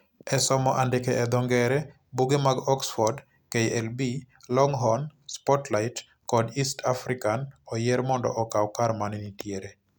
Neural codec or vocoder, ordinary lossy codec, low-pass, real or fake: none; none; none; real